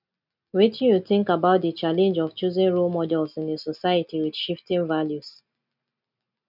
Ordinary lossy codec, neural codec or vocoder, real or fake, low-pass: none; none; real; 5.4 kHz